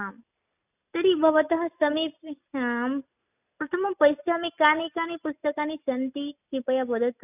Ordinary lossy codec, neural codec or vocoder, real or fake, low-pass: none; none; real; 3.6 kHz